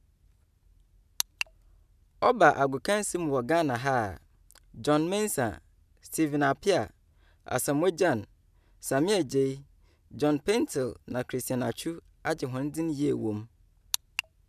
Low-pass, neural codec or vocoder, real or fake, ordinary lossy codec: 14.4 kHz; none; real; none